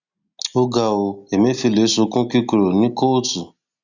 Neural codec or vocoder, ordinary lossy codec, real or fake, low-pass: none; none; real; 7.2 kHz